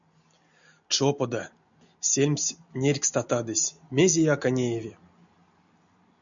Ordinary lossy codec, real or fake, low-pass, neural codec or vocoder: MP3, 96 kbps; real; 7.2 kHz; none